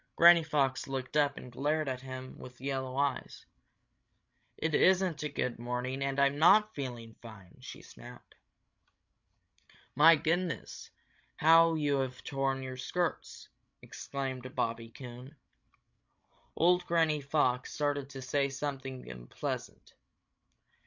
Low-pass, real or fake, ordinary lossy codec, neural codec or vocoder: 7.2 kHz; fake; MP3, 64 kbps; codec, 16 kHz, 16 kbps, FreqCodec, larger model